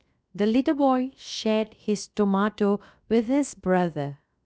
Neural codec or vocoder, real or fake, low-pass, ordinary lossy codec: codec, 16 kHz, 0.7 kbps, FocalCodec; fake; none; none